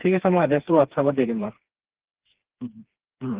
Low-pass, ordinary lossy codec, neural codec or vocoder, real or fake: 3.6 kHz; Opus, 16 kbps; codec, 16 kHz, 2 kbps, FreqCodec, smaller model; fake